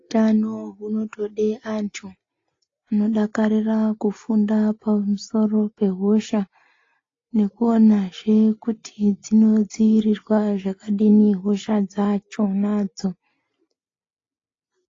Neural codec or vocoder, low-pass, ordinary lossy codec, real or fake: none; 7.2 kHz; AAC, 32 kbps; real